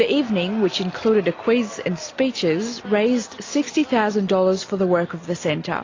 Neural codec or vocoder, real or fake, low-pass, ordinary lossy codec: none; real; 7.2 kHz; AAC, 32 kbps